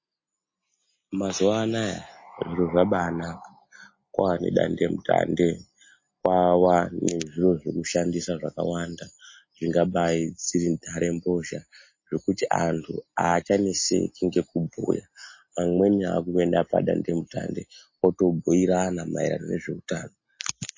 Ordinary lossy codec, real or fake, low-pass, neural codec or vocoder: MP3, 32 kbps; real; 7.2 kHz; none